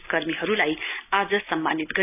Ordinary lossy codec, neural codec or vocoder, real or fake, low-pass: none; none; real; 3.6 kHz